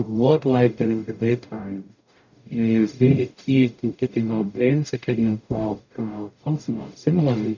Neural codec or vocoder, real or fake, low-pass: codec, 44.1 kHz, 0.9 kbps, DAC; fake; 7.2 kHz